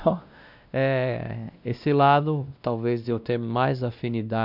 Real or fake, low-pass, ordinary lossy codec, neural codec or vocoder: fake; 5.4 kHz; none; codec, 16 kHz, 1 kbps, X-Codec, WavLM features, trained on Multilingual LibriSpeech